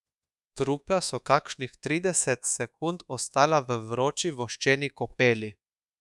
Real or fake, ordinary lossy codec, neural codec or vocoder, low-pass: fake; none; codec, 24 kHz, 1.2 kbps, DualCodec; none